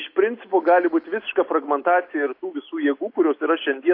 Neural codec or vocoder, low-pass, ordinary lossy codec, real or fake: none; 5.4 kHz; AAC, 32 kbps; real